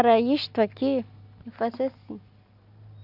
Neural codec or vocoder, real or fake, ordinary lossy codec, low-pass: none; real; none; 5.4 kHz